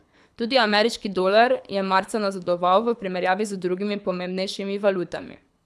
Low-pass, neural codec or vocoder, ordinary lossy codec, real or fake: none; codec, 24 kHz, 6 kbps, HILCodec; none; fake